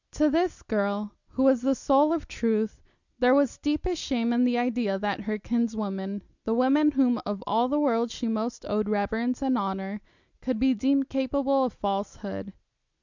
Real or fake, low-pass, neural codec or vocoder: real; 7.2 kHz; none